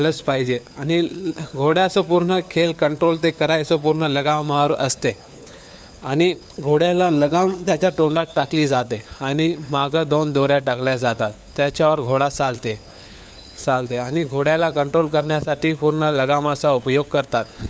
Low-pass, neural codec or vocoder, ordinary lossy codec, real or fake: none; codec, 16 kHz, 4 kbps, FunCodec, trained on LibriTTS, 50 frames a second; none; fake